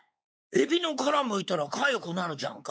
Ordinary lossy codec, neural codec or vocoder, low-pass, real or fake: none; codec, 16 kHz, 4 kbps, X-Codec, WavLM features, trained on Multilingual LibriSpeech; none; fake